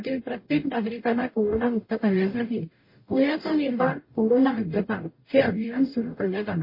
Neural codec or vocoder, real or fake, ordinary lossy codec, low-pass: codec, 44.1 kHz, 0.9 kbps, DAC; fake; MP3, 24 kbps; 5.4 kHz